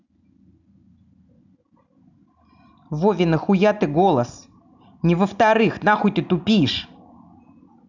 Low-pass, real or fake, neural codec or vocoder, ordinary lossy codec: 7.2 kHz; real; none; none